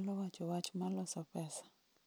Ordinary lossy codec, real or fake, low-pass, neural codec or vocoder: none; real; none; none